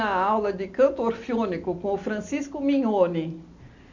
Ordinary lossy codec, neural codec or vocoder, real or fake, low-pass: MP3, 64 kbps; none; real; 7.2 kHz